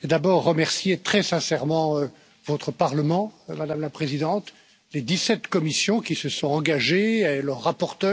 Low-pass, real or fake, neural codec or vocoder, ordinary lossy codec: none; real; none; none